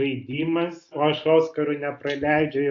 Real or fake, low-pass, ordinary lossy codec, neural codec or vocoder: real; 7.2 kHz; AAC, 48 kbps; none